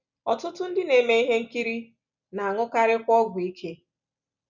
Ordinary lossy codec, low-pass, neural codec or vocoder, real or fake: none; 7.2 kHz; none; real